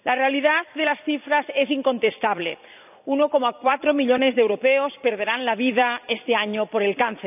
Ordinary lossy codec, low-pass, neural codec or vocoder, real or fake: none; 3.6 kHz; vocoder, 44.1 kHz, 128 mel bands every 256 samples, BigVGAN v2; fake